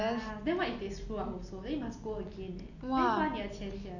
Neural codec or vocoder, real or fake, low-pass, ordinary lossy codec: none; real; 7.2 kHz; none